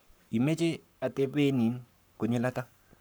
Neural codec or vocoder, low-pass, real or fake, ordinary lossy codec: codec, 44.1 kHz, 7.8 kbps, Pupu-Codec; none; fake; none